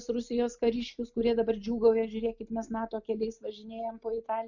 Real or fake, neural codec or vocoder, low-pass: real; none; 7.2 kHz